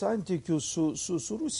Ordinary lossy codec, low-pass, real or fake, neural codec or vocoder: MP3, 48 kbps; 10.8 kHz; real; none